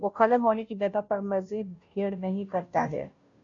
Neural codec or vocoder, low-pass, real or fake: codec, 16 kHz, 0.5 kbps, FunCodec, trained on Chinese and English, 25 frames a second; 7.2 kHz; fake